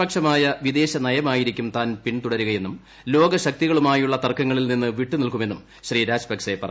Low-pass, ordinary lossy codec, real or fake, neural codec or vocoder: none; none; real; none